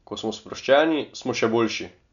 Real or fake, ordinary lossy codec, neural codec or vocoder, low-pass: real; none; none; 7.2 kHz